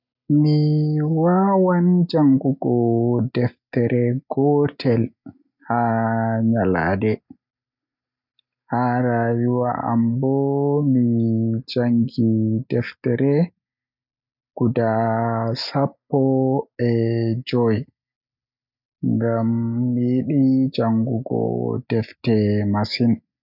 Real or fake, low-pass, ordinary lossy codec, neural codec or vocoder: real; 5.4 kHz; none; none